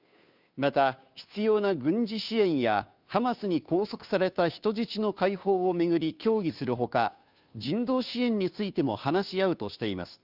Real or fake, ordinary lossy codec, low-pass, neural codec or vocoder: fake; none; 5.4 kHz; codec, 16 kHz, 2 kbps, FunCodec, trained on Chinese and English, 25 frames a second